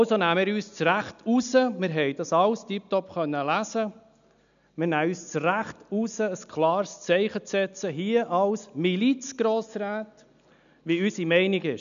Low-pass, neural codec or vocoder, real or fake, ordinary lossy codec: 7.2 kHz; none; real; none